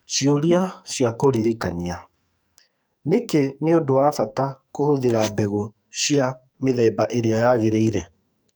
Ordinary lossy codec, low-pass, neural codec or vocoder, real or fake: none; none; codec, 44.1 kHz, 2.6 kbps, SNAC; fake